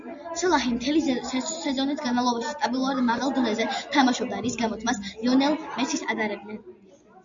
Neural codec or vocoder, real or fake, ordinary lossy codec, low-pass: none; real; Opus, 64 kbps; 7.2 kHz